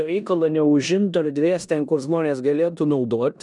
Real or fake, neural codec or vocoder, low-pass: fake; codec, 16 kHz in and 24 kHz out, 0.9 kbps, LongCat-Audio-Codec, four codebook decoder; 10.8 kHz